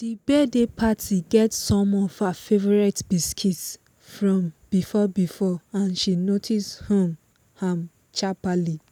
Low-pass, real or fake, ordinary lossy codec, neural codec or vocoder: none; real; none; none